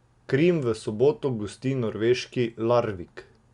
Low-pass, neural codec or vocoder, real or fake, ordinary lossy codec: 10.8 kHz; none; real; Opus, 64 kbps